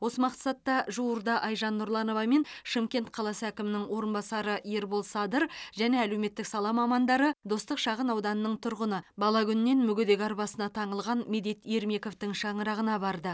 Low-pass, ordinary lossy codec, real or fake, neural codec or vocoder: none; none; real; none